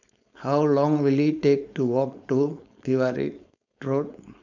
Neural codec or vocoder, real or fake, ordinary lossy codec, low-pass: codec, 16 kHz, 4.8 kbps, FACodec; fake; none; 7.2 kHz